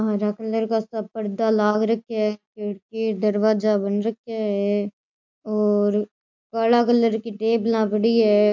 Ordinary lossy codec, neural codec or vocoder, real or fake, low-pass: MP3, 48 kbps; none; real; 7.2 kHz